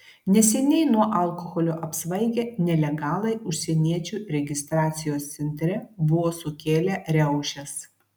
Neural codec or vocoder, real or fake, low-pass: none; real; 19.8 kHz